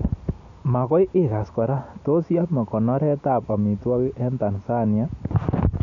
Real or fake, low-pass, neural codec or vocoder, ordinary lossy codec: real; 7.2 kHz; none; none